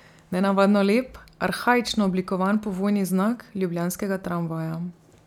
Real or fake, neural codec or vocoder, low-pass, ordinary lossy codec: real; none; 19.8 kHz; none